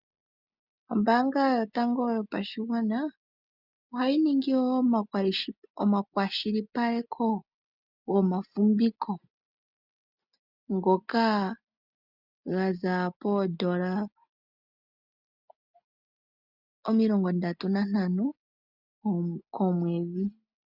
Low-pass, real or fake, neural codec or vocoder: 5.4 kHz; real; none